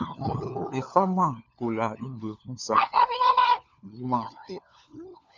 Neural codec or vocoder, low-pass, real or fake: codec, 16 kHz, 4 kbps, FunCodec, trained on LibriTTS, 50 frames a second; 7.2 kHz; fake